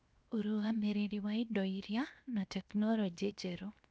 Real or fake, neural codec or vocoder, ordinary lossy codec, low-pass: fake; codec, 16 kHz, 0.7 kbps, FocalCodec; none; none